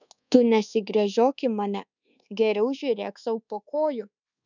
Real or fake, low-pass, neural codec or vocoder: fake; 7.2 kHz; codec, 24 kHz, 1.2 kbps, DualCodec